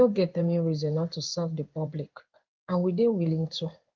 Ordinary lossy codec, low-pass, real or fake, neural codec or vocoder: Opus, 24 kbps; 7.2 kHz; fake; codec, 16 kHz in and 24 kHz out, 1 kbps, XY-Tokenizer